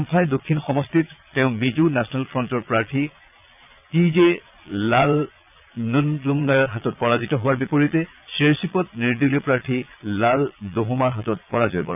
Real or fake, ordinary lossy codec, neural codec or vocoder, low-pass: fake; none; vocoder, 22.05 kHz, 80 mel bands, Vocos; 3.6 kHz